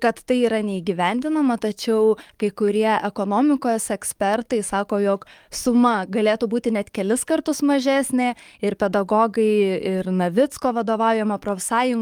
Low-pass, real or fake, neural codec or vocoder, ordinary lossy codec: 19.8 kHz; fake; codec, 44.1 kHz, 7.8 kbps, DAC; Opus, 32 kbps